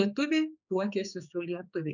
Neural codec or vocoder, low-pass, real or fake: codec, 16 kHz, 4 kbps, X-Codec, HuBERT features, trained on general audio; 7.2 kHz; fake